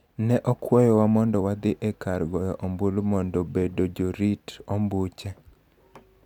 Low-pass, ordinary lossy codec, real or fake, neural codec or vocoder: 19.8 kHz; none; real; none